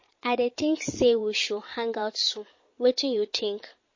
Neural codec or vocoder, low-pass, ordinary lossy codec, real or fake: vocoder, 22.05 kHz, 80 mel bands, Vocos; 7.2 kHz; MP3, 32 kbps; fake